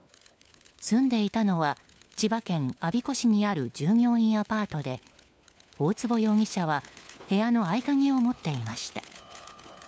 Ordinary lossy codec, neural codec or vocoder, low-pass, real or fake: none; codec, 16 kHz, 4 kbps, FunCodec, trained on LibriTTS, 50 frames a second; none; fake